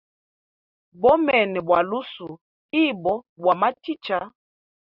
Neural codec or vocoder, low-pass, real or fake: none; 5.4 kHz; real